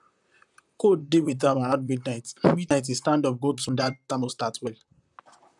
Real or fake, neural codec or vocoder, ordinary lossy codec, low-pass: fake; vocoder, 44.1 kHz, 128 mel bands, Pupu-Vocoder; none; 10.8 kHz